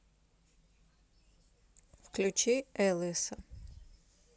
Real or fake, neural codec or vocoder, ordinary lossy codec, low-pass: real; none; none; none